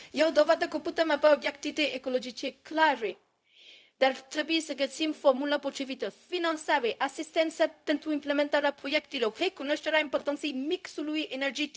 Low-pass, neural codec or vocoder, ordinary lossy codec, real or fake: none; codec, 16 kHz, 0.4 kbps, LongCat-Audio-Codec; none; fake